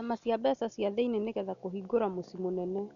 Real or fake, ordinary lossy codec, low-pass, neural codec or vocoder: real; none; 7.2 kHz; none